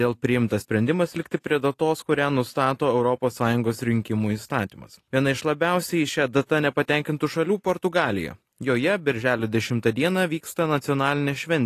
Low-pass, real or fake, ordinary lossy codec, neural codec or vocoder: 14.4 kHz; real; AAC, 48 kbps; none